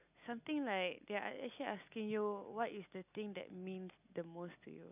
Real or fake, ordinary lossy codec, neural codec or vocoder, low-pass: real; none; none; 3.6 kHz